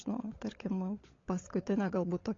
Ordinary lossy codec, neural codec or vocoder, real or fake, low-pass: AAC, 32 kbps; codec, 16 kHz, 16 kbps, FunCodec, trained on LibriTTS, 50 frames a second; fake; 7.2 kHz